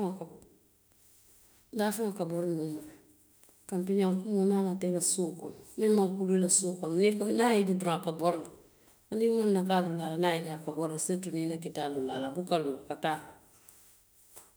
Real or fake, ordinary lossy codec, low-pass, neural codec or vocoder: fake; none; none; autoencoder, 48 kHz, 32 numbers a frame, DAC-VAE, trained on Japanese speech